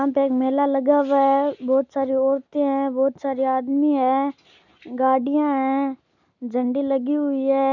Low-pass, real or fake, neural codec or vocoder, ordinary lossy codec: 7.2 kHz; real; none; none